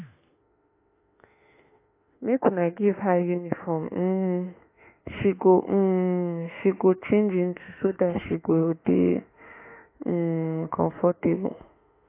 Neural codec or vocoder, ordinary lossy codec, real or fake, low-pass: autoencoder, 48 kHz, 32 numbers a frame, DAC-VAE, trained on Japanese speech; AAC, 24 kbps; fake; 3.6 kHz